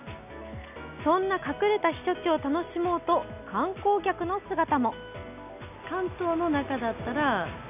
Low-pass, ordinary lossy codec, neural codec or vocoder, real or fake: 3.6 kHz; none; none; real